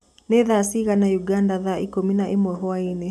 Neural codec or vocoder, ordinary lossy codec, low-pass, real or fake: none; none; 14.4 kHz; real